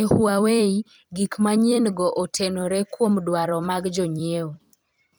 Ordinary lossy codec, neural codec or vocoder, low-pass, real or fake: none; vocoder, 44.1 kHz, 128 mel bands every 256 samples, BigVGAN v2; none; fake